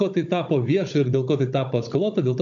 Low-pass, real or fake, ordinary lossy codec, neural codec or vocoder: 7.2 kHz; fake; AAC, 64 kbps; codec, 16 kHz, 16 kbps, FunCodec, trained on Chinese and English, 50 frames a second